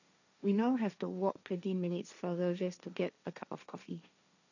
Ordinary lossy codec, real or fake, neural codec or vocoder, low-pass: none; fake; codec, 16 kHz, 1.1 kbps, Voila-Tokenizer; 7.2 kHz